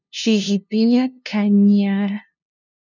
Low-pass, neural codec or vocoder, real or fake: 7.2 kHz; codec, 16 kHz, 0.5 kbps, FunCodec, trained on LibriTTS, 25 frames a second; fake